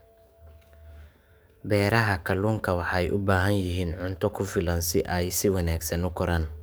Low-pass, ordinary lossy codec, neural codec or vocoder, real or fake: none; none; codec, 44.1 kHz, 7.8 kbps, DAC; fake